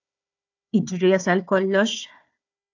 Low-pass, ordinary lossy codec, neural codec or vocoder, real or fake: 7.2 kHz; AAC, 48 kbps; codec, 16 kHz, 4 kbps, FunCodec, trained on Chinese and English, 50 frames a second; fake